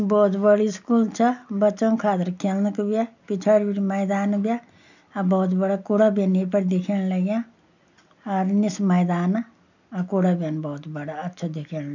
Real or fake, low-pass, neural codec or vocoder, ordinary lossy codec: real; 7.2 kHz; none; none